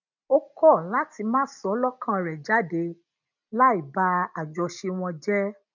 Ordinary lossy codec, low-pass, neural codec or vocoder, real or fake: none; 7.2 kHz; none; real